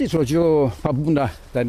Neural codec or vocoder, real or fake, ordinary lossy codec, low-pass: none; real; AAC, 96 kbps; 14.4 kHz